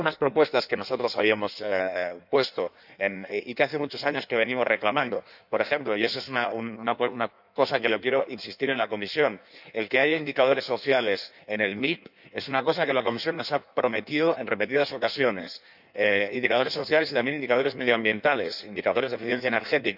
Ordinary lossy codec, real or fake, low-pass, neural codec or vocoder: none; fake; 5.4 kHz; codec, 16 kHz in and 24 kHz out, 1.1 kbps, FireRedTTS-2 codec